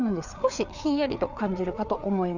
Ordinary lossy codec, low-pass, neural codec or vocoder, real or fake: none; 7.2 kHz; codec, 16 kHz, 4 kbps, FreqCodec, larger model; fake